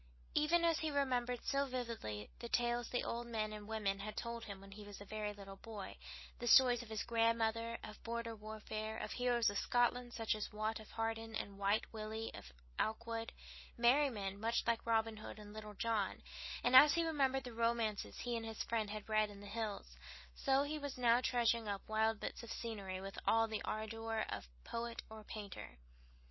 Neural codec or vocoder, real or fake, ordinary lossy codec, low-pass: none; real; MP3, 24 kbps; 7.2 kHz